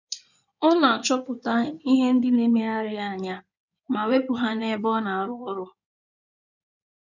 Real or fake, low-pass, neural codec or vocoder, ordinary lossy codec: fake; 7.2 kHz; codec, 16 kHz in and 24 kHz out, 2.2 kbps, FireRedTTS-2 codec; none